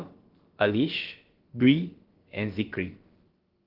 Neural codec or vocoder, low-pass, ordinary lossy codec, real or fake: codec, 16 kHz, about 1 kbps, DyCAST, with the encoder's durations; 5.4 kHz; Opus, 16 kbps; fake